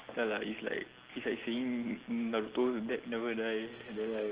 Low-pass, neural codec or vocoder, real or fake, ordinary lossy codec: 3.6 kHz; none; real; Opus, 16 kbps